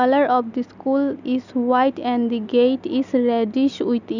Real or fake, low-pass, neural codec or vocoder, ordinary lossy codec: real; 7.2 kHz; none; none